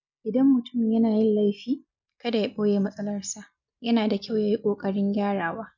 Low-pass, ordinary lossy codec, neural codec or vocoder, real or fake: 7.2 kHz; none; none; real